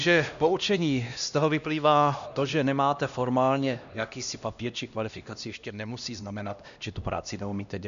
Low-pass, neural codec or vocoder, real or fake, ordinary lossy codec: 7.2 kHz; codec, 16 kHz, 1 kbps, X-Codec, HuBERT features, trained on LibriSpeech; fake; MP3, 96 kbps